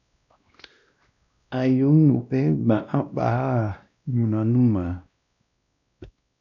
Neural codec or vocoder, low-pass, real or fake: codec, 16 kHz, 1 kbps, X-Codec, WavLM features, trained on Multilingual LibriSpeech; 7.2 kHz; fake